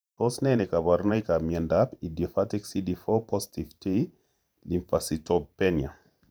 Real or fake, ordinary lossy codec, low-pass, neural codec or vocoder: fake; none; none; vocoder, 44.1 kHz, 128 mel bands every 512 samples, BigVGAN v2